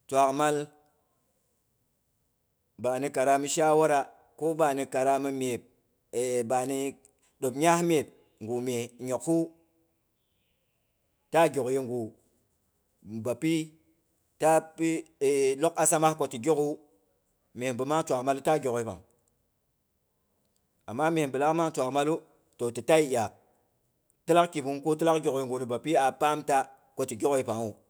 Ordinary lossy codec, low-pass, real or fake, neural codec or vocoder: none; none; fake; autoencoder, 48 kHz, 128 numbers a frame, DAC-VAE, trained on Japanese speech